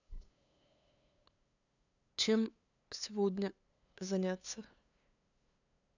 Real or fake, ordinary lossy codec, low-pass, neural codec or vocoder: fake; none; 7.2 kHz; codec, 16 kHz, 2 kbps, FunCodec, trained on LibriTTS, 25 frames a second